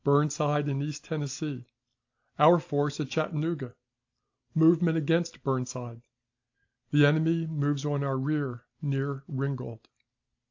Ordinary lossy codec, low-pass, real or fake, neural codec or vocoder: AAC, 48 kbps; 7.2 kHz; real; none